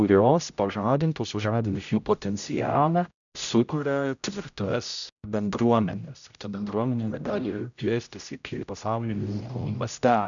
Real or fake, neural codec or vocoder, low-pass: fake; codec, 16 kHz, 0.5 kbps, X-Codec, HuBERT features, trained on general audio; 7.2 kHz